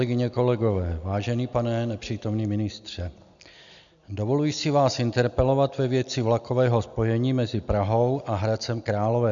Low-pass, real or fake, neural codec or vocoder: 7.2 kHz; real; none